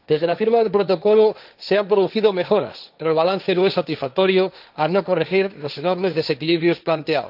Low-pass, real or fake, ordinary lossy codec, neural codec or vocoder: 5.4 kHz; fake; none; codec, 16 kHz, 1.1 kbps, Voila-Tokenizer